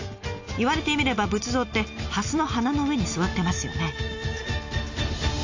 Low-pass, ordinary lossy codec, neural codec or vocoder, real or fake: 7.2 kHz; AAC, 48 kbps; none; real